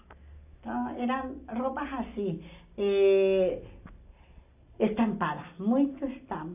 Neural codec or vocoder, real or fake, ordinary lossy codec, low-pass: none; real; AAC, 32 kbps; 3.6 kHz